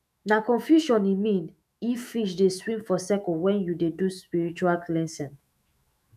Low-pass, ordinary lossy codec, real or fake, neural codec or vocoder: 14.4 kHz; none; fake; autoencoder, 48 kHz, 128 numbers a frame, DAC-VAE, trained on Japanese speech